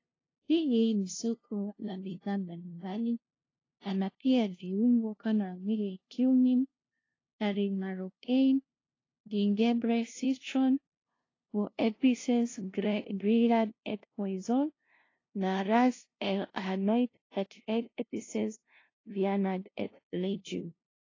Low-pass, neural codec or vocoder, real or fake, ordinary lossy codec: 7.2 kHz; codec, 16 kHz, 0.5 kbps, FunCodec, trained on LibriTTS, 25 frames a second; fake; AAC, 32 kbps